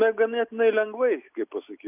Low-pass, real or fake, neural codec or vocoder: 3.6 kHz; real; none